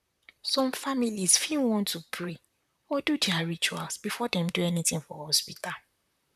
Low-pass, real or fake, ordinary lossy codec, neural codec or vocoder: 14.4 kHz; fake; none; vocoder, 44.1 kHz, 128 mel bands, Pupu-Vocoder